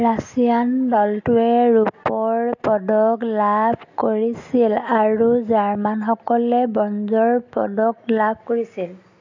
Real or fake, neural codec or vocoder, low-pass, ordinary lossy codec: real; none; 7.2 kHz; AAC, 48 kbps